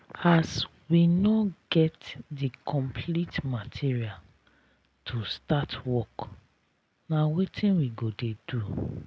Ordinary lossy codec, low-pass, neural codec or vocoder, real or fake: none; none; none; real